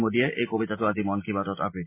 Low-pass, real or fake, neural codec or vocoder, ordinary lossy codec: 3.6 kHz; real; none; MP3, 24 kbps